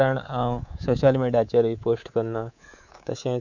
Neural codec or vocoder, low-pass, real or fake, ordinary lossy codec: codec, 24 kHz, 3.1 kbps, DualCodec; 7.2 kHz; fake; none